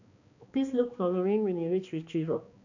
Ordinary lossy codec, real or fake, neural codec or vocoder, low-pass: none; fake; codec, 16 kHz, 2 kbps, X-Codec, HuBERT features, trained on balanced general audio; 7.2 kHz